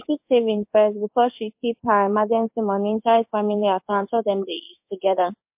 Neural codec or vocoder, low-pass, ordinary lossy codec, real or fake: codec, 16 kHz in and 24 kHz out, 1 kbps, XY-Tokenizer; 3.6 kHz; MP3, 32 kbps; fake